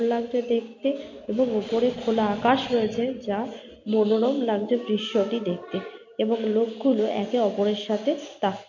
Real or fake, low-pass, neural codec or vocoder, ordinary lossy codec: real; 7.2 kHz; none; MP3, 48 kbps